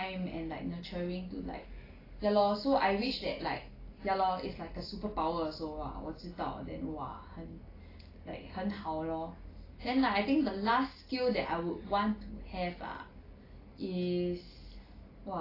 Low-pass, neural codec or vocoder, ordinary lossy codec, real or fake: 5.4 kHz; none; AAC, 24 kbps; real